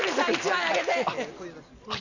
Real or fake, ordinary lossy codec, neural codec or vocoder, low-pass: real; none; none; 7.2 kHz